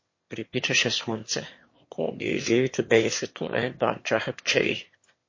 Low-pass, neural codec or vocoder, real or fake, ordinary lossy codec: 7.2 kHz; autoencoder, 22.05 kHz, a latent of 192 numbers a frame, VITS, trained on one speaker; fake; MP3, 32 kbps